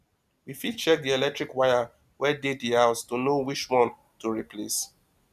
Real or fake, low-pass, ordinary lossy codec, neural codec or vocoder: real; 14.4 kHz; none; none